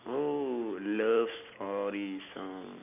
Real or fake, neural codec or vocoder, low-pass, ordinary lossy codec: real; none; 3.6 kHz; none